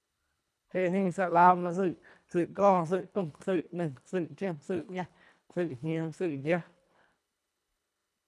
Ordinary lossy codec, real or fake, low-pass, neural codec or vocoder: none; fake; none; codec, 24 kHz, 1.5 kbps, HILCodec